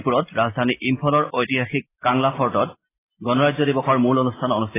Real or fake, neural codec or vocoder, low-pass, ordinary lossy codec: real; none; 3.6 kHz; AAC, 16 kbps